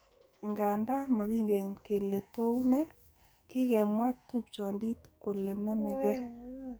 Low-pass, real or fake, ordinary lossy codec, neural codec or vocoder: none; fake; none; codec, 44.1 kHz, 2.6 kbps, SNAC